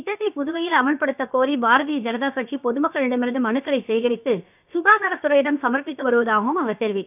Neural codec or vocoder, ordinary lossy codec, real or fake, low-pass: codec, 16 kHz, about 1 kbps, DyCAST, with the encoder's durations; none; fake; 3.6 kHz